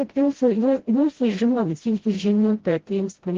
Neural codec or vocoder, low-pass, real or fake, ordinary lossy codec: codec, 16 kHz, 0.5 kbps, FreqCodec, smaller model; 7.2 kHz; fake; Opus, 16 kbps